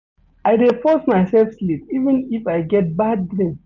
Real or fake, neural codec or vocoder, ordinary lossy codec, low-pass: real; none; none; 7.2 kHz